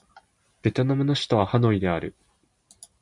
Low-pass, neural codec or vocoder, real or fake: 10.8 kHz; none; real